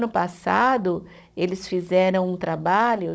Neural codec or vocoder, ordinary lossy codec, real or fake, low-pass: codec, 16 kHz, 16 kbps, FunCodec, trained on Chinese and English, 50 frames a second; none; fake; none